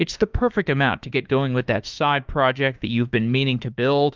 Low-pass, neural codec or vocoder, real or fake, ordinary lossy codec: 7.2 kHz; autoencoder, 48 kHz, 32 numbers a frame, DAC-VAE, trained on Japanese speech; fake; Opus, 32 kbps